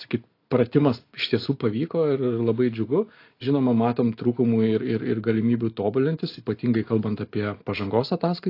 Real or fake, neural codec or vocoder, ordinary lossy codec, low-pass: real; none; AAC, 32 kbps; 5.4 kHz